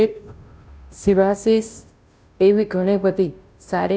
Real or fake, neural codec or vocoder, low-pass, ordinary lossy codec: fake; codec, 16 kHz, 0.5 kbps, FunCodec, trained on Chinese and English, 25 frames a second; none; none